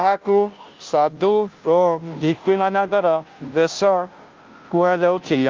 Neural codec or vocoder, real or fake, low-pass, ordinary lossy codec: codec, 16 kHz, 0.5 kbps, FunCodec, trained on Chinese and English, 25 frames a second; fake; 7.2 kHz; Opus, 32 kbps